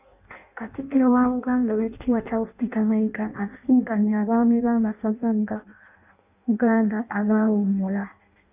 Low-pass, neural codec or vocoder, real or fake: 3.6 kHz; codec, 16 kHz in and 24 kHz out, 0.6 kbps, FireRedTTS-2 codec; fake